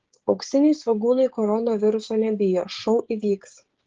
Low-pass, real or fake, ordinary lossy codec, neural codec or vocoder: 7.2 kHz; fake; Opus, 16 kbps; codec, 16 kHz, 16 kbps, FreqCodec, smaller model